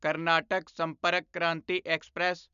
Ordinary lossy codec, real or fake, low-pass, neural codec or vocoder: none; real; 7.2 kHz; none